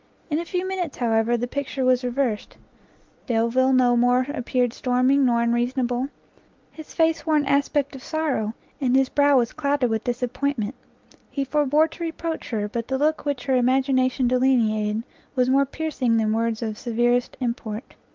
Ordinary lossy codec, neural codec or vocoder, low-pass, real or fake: Opus, 32 kbps; none; 7.2 kHz; real